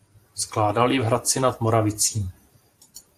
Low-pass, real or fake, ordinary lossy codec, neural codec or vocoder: 14.4 kHz; fake; AAC, 64 kbps; vocoder, 44.1 kHz, 128 mel bands every 512 samples, BigVGAN v2